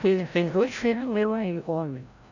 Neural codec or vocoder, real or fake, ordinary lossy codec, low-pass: codec, 16 kHz, 0.5 kbps, FreqCodec, larger model; fake; none; 7.2 kHz